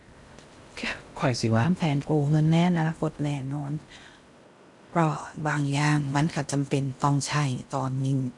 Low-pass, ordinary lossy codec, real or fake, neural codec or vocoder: 10.8 kHz; none; fake; codec, 16 kHz in and 24 kHz out, 0.6 kbps, FocalCodec, streaming, 2048 codes